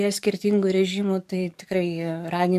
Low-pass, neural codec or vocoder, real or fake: 14.4 kHz; codec, 44.1 kHz, 7.8 kbps, DAC; fake